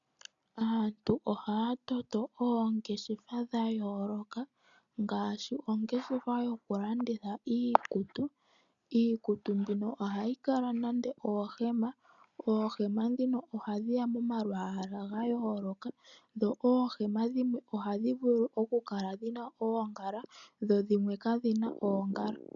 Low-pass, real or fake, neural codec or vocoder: 7.2 kHz; real; none